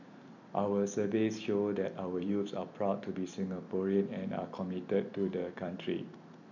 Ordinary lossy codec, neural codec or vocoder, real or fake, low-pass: none; vocoder, 44.1 kHz, 128 mel bands every 256 samples, BigVGAN v2; fake; 7.2 kHz